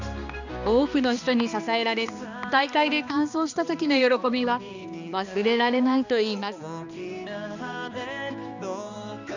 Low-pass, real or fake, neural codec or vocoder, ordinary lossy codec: 7.2 kHz; fake; codec, 16 kHz, 2 kbps, X-Codec, HuBERT features, trained on balanced general audio; none